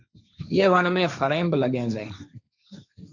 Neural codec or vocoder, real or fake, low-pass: codec, 16 kHz, 1.1 kbps, Voila-Tokenizer; fake; 7.2 kHz